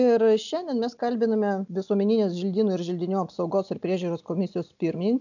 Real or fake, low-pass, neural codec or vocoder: real; 7.2 kHz; none